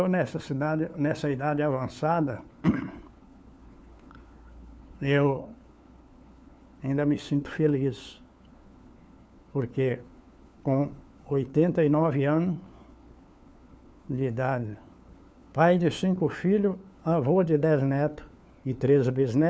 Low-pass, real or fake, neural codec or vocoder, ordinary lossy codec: none; fake; codec, 16 kHz, 4 kbps, FunCodec, trained on LibriTTS, 50 frames a second; none